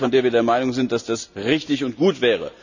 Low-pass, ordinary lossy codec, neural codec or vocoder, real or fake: 7.2 kHz; none; none; real